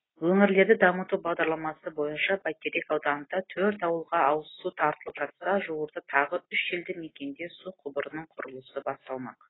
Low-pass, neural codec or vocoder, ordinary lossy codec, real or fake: 7.2 kHz; none; AAC, 16 kbps; real